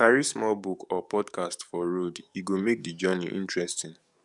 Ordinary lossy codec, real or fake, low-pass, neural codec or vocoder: none; fake; 10.8 kHz; codec, 44.1 kHz, 7.8 kbps, DAC